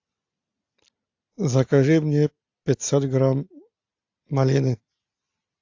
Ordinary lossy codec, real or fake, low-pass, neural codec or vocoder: AAC, 48 kbps; real; 7.2 kHz; none